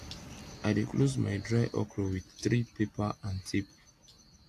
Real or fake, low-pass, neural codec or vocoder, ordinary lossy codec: real; 14.4 kHz; none; AAC, 64 kbps